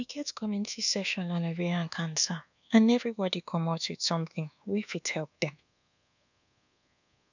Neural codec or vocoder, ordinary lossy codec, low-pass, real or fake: codec, 24 kHz, 1.2 kbps, DualCodec; none; 7.2 kHz; fake